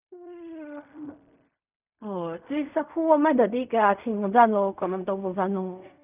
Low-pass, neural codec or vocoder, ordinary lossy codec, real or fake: 3.6 kHz; codec, 16 kHz in and 24 kHz out, 0.4 kbps, LongCat-Audio-Codec, fine tuned four codebook decoder; none; fake